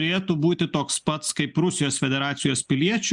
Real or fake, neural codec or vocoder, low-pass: real; none; 10.8 kHz